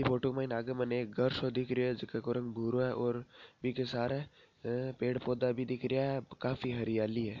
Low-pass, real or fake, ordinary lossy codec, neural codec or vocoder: 7.2 kHz; real; none; none